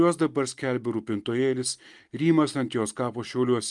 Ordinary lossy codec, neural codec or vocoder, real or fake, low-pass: Opus, 32 kbps; none; real; 10.8 kHz